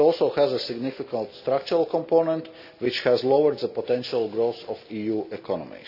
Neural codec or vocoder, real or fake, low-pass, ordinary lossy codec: none; real; 5.4 kHz; none